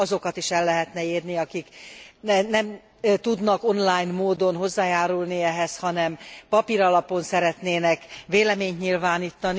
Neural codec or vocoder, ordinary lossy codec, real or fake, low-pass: none; none; real; none